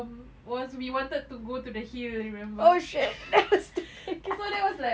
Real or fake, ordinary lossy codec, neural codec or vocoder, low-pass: real; none; none; none